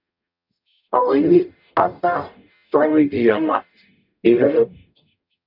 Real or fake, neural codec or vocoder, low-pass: fake; codec, 44.1 kHz, 0.9 kbps, DAC; 5.4 kHz